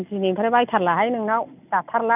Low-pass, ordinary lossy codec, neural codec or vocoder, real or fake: 3.6 kHz; none; none; real